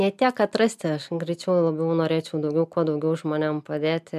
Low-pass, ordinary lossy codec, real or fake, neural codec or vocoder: 14.4 kHz; MP3, 96 kbps; real; none